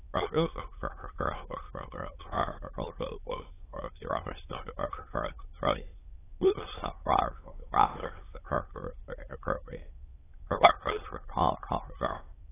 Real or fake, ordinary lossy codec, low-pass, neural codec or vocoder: fake; AAC, 16 kbps; 3.6 kHz; autoencoder, 22.05 kHz, a latent of 192 numbers a frame, VITS, trained on many speakers